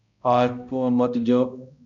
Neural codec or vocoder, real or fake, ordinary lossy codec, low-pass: codec, 16 kHz, 0.5 kbps, X-Codec, HuBERT features, trained on balanced general audio; fake; MP3, 64 kbps; 7.2 kHz